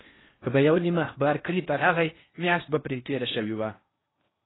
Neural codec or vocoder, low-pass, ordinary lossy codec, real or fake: codec, 16 kHz in and 24 kHz out, 0.8 kbps, FocalCodec, streaming, 65536 codes; 7.2 kHz; AAC, 16 kbps; fake